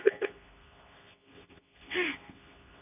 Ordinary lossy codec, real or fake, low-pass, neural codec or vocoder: none; fake; 3.6 kHz; codec, 24 kHz, 0.9 kbps, WavTokenizer, medium speech release version 2